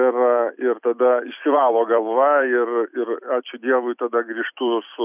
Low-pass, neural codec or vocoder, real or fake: 3.6 kHz; vocoder, 44.1 kHz, 128 mel bands every 256 samples, BigVGAN v2; fake